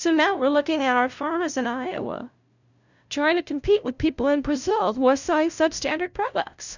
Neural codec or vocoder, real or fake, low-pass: codec, 16 kHz, 0.5 kbps, FunCodec, trained on LibriTTS, 25 frames a second; fake; 7.2 kHz